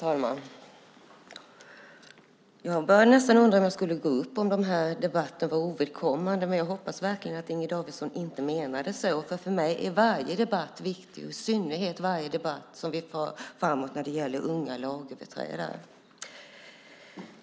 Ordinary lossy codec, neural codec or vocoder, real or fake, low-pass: none; none; real; none